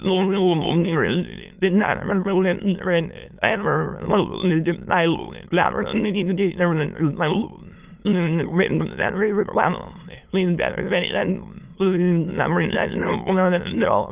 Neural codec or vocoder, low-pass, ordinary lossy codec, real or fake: autoencoder, 22.05 kHz, a latent of 192 numbers a frame, VITS, trained on many speakers; 3.6 kHz; Opus, 24 kbps; fake